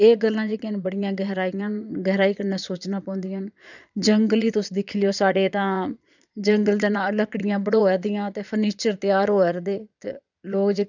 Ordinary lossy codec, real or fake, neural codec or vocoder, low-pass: none; fake; vocoder, 22.05 kHz, 80 mel bands, Vocos; 7.2 kHz